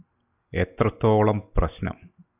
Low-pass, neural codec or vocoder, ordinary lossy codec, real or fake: 3.6 kHz; none; AAC, 32 kbps; real